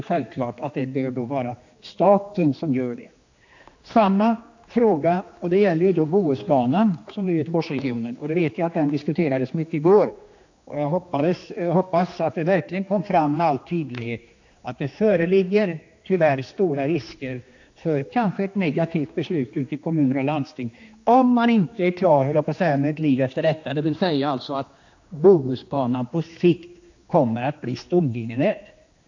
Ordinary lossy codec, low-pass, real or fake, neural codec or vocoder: AAC, 48 kbps; 7.2 kHz; fake; codec, 16 kHz, 2 kbps, X-Codec, HuBERT features, trained on general audio